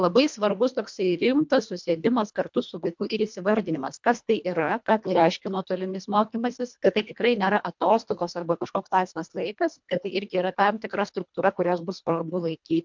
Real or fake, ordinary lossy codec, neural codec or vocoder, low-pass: fake; MP3, 64 kbps; codec, 24 kHz, 1.5 kbps, HILCodec; 7.2 kHz